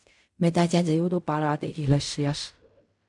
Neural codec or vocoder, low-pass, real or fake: codec, 16 kHz in and 24 kHz out, 0.4 kbps, LongCat-Audio-Codec, fine tuned four codebook decoder; 10.8 kHz; fake